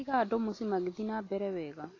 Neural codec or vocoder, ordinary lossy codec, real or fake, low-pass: none; AAC, 32 kbps; real; 7.2 kHz